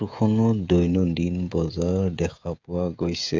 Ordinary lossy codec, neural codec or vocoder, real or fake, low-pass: MP3, 64 kbps; codec, 16 kHz, 16 kbps, FreqCodec, smaller model; fake; 7.2 kHz